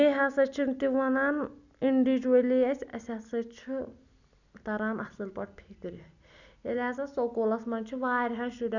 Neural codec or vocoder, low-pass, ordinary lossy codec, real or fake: none; 7.2 kHz; none; real